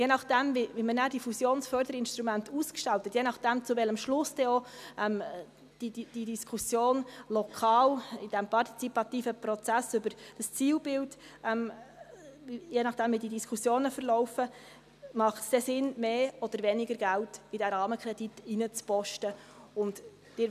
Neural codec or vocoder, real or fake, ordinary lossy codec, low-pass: none; real; none; 14.4 kHz